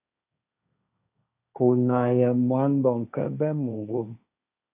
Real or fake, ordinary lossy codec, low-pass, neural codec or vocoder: fake; AAC, 32 kbps; 3.6 kHz; codec, 16 kHz, 1.1 kbps, Voila-Tokenizer